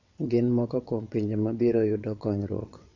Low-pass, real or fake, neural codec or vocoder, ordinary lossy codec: 7.2 kHz; fake; vocoder, 22.05 kHz, 80 mel bands, WaveNeXt; AAC, 48 kbps